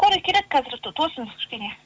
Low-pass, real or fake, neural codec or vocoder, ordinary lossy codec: none; real; none; none